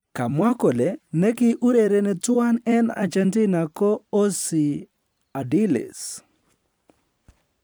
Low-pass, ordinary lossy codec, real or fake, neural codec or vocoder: none; none; fake; vocoder, 44.1 kHz, 128 mel bands every 256 samples, BigVGAN v2